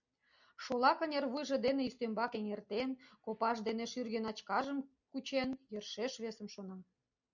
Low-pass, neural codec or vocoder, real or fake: 7.2 kHz; none; real